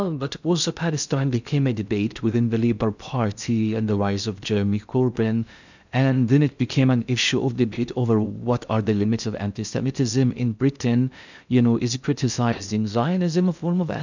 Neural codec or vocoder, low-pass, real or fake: codec, 16 kHz in and 24 kHz out, 0.6 kbps, FocalCodec, streaming, 2048 codes; 7.2 kHz; fake